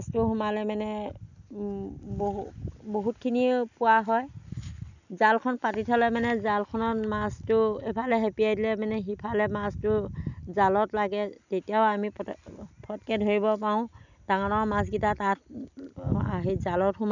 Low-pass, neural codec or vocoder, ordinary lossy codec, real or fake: 7.2 kHz; none; none; real